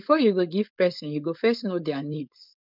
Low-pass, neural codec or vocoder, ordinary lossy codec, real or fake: 5.4 kHz; codec, 16 kHz, 4.8 kbps, FACodec; none; fake